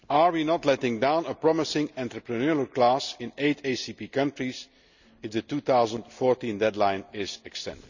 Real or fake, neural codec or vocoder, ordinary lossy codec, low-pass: real; none; none; 7.2 kHz